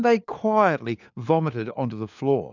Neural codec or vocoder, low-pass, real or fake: codec, 16 kHz, 4 kbps, FunCodec, trained on LibriTTS, 50 frames a second; 7.2 kHz; fake